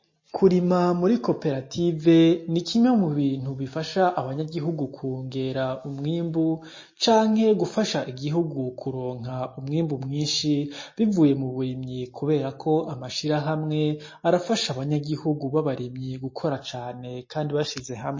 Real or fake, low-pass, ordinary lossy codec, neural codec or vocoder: real; 7.2 kHz; MP3, 32 kbps; none